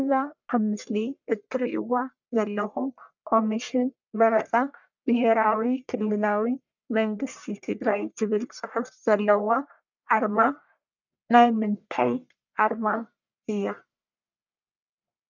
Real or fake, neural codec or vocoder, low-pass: fake; codec, 44.1 kHz, 1.7 kbps, Pupu-Codec; 7.2 kHz